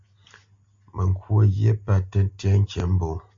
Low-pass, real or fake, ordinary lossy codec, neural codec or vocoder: 7.2 kHz; real; MP3, 48 kbps; none